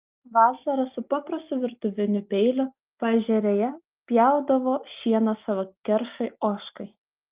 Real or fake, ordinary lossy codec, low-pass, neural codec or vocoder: real; Opus, 24 kbps; 3.6 kHz; none